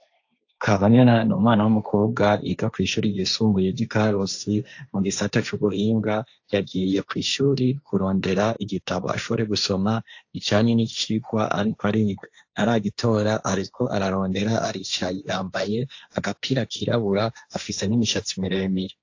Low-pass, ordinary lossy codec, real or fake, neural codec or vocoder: 7.2 kHz; AAC, 48 kbps; fake; codec, 16 kHz, 1.1 kbps, Voila-Tokenizer